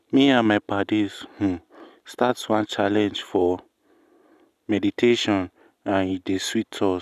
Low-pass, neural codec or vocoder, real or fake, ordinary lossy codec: 14.4 kHz; none; real; none